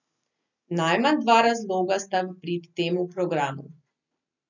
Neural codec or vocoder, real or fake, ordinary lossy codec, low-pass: none; real; none; 7.2 kHz